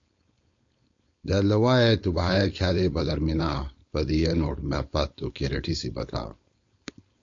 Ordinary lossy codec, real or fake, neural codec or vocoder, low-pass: AAC, 48 kbps; fake; codec, 16 kHz, 4.8 kbps, FACodec; 7.2 kHz